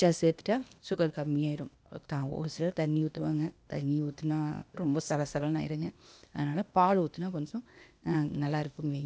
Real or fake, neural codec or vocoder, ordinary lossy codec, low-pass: fake; codec, 16 kHz, 0.8 kbps, ZipCodec; none; none